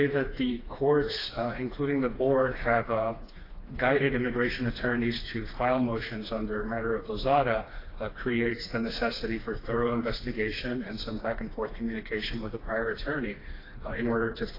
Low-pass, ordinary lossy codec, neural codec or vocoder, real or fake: 5.4 kHz; AAC, 24 kbps; codec, 16 kHz, 2 kbps, FreqCodec, smaller model; fake